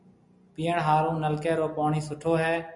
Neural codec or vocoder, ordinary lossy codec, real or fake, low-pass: none; MP3, 96 kbps; real; 10.8 kHz